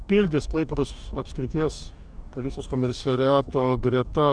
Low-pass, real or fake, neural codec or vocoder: 9.9 kHz; fake; codec, 44.1 kHz, 2.6 kbps, DAC